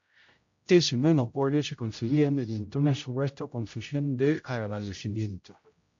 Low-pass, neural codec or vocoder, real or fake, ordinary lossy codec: 7.2 kHz; codec, 16 kHz, 0.5 kbps, X-Codec, HuBERT features, trained on general audio; fake; MP3, 48 kbps